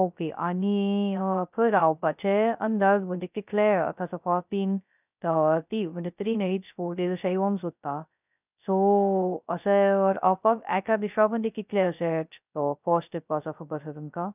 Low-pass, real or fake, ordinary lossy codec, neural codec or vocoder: 3.6 kHz; fake; none; codec, 16 kHz, 0.2 kbps, FocalCodec